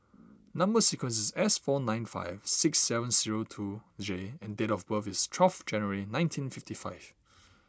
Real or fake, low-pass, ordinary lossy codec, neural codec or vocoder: real; none; none; none